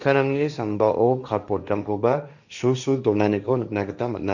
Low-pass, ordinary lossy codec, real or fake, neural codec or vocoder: 7.2 kHz; none; fake; codec, 16 kHz, 1.1 kbps, Voila-Tokenizer